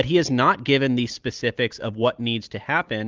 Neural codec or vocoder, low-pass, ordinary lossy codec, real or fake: none; 7.2 kHz; Opus, 32 kbps; real